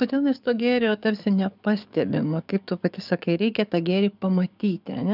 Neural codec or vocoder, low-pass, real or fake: codec, 44.1 kHz, 7.8 kbps, DAC; 5.4 kHz; fake